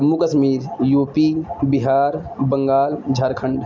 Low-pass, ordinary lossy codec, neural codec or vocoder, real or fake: 7.2 kHz; MP3, 64 kbps; none; real